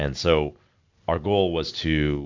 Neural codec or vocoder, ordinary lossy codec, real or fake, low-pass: none; MP3, 64 kbps; real; 7.2 kHz